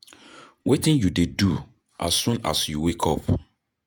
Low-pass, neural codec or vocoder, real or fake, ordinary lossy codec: none; vocoder, 48 kHz, 128 mel bands, Vocos; fake; none